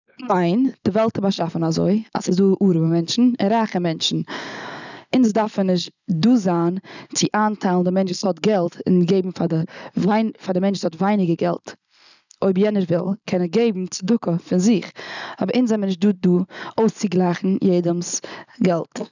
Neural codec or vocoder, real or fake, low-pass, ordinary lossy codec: none; real; 7.2 kHz; none